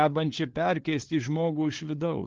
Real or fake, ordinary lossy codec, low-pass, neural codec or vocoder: fake; Opus, 16 kbps; 7.2 kHz; codec, 16 kHz, 2 kbps, FunCodec, trained on LibriTTS, 25 frames a second